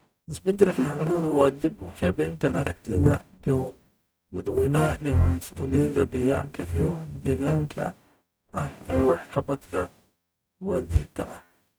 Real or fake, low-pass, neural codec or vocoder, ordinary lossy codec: fake; none; codec, 44.1 kHz, 0.9 kbps, DAC; none